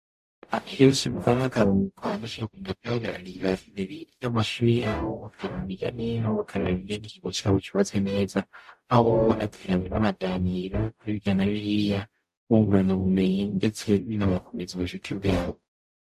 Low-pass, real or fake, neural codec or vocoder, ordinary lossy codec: 14.4 kHz; fake; codec, 44.1 kHz, 0.9 kbps, DAC; MP3, 64 kbps